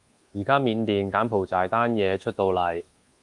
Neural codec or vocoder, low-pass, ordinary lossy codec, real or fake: codec, 24 kHz, 1.2 kbps, DualCodec; 10.8 kHz; Opus, 32 kbps; fake